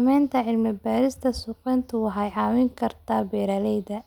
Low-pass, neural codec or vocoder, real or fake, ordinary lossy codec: 19.8 kHz; none; real; none